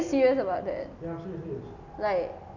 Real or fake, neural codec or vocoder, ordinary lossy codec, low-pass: real; none; none; 7.2 kHz